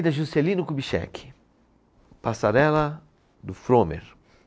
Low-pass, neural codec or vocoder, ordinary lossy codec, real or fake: none; none; none; real